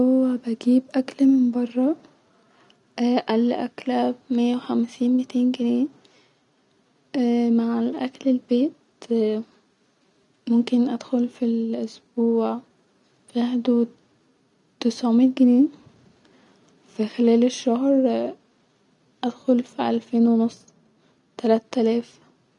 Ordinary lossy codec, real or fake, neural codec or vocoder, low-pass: none; real; none; 10.8 kHz